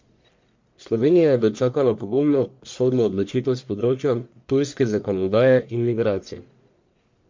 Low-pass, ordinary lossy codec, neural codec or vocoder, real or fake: 7.2 kHz; MP3, 48 kbps; codec, 44.1 kHz, 1.7 kbps, Pupu-Codec; fake